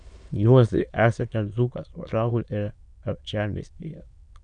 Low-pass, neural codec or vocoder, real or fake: 9.9 kHz; autoencoder, 22.05 kHz, a latent of 192 numbers a frame, VITS, trained on many speakers; fake